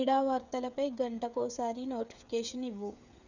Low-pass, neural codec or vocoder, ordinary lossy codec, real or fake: 7.2 kHz; codec, 16 kHz, 8 kbps, FreqCodec, smaller model; none; fake